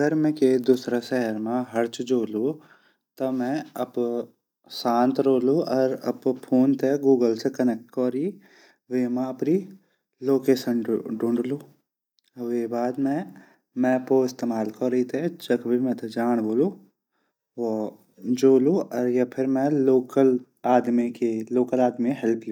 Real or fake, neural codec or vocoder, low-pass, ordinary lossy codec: real; none; 19.8 kHz; none